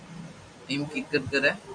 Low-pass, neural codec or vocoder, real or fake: 9.9 kHz; none; real